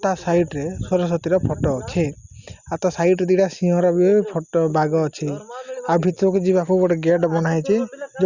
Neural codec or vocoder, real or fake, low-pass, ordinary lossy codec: none; real; 7.2 kHz; Opus, 64 kbps